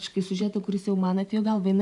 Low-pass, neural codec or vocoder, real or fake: 10.8 kHz; vocoder, 44.1 kHz, 128 mel bands every 512 samples, BigVGAN v2; fake